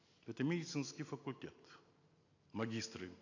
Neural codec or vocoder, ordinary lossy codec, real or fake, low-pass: none; AAC, 48 kbps; real; 7.2 kHz